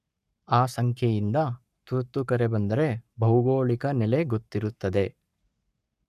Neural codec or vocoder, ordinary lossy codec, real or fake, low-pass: codec, 44.1 kHz, 7.8 kbps, Pupu-Codec; none; fake; 14.4 kHz